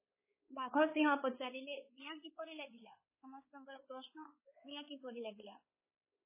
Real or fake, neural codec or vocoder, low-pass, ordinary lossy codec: fake; codec, 16 kHz, 4 kbps, X-Codec, WavLM features, trained on Multilingual LibriSpeech; 3.6 kHz; MP3, 16 kbps